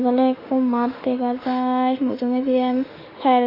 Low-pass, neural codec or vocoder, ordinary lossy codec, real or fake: 5.4 kHz; autoencoder, 48 kHz, 32 numbers a frame, DAC-VAE, trained on Japanese speech; AAC, 24 kbps; fake